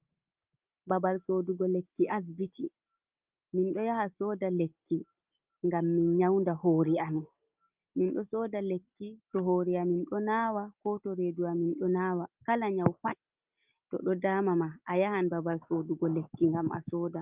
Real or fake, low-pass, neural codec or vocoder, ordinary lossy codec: real; 3.6 kHz; none; Opus, 24 kbps